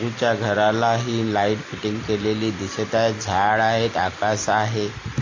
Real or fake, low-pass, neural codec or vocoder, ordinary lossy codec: real; 7.2 kHz; none; AAC, 32 kbps